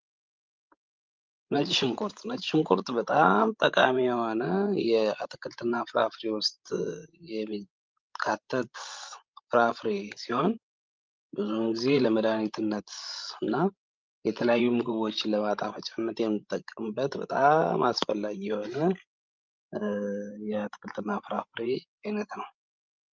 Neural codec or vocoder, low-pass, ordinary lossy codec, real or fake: codec, 16 kHz, 16 kbps, FreqCodec, larger model; 7.2 kHz; Opus, 24 kbps; fake